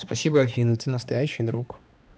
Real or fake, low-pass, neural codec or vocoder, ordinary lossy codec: fake; none; codec, 16 kHz, 2 kbps, X-Codec, HuBERT features, trained on balanced general audio; none